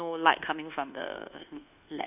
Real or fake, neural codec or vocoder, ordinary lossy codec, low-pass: fake; codec, 24 kHz, 1.2 kbps, DualCodec; none; 3.6 kHz